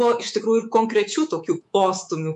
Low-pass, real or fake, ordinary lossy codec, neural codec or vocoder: 10.8 kHz; fake; MP3, 64 kbps; codec, 24 kHz, 3.1 kbps, DualCodec